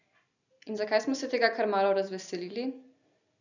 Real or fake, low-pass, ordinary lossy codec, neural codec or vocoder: real; 7.2 kHz; none; none